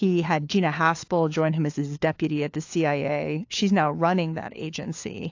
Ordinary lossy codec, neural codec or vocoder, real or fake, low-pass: AAC, 48 kbps; codec, 16 kHz, 4 kbps, FunCodec, trained on LibriTTS, 50 frames a second; fake; 7.2 kHz